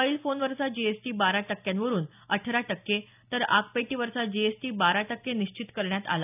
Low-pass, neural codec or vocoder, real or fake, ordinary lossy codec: 3.6 kHz; none; real; none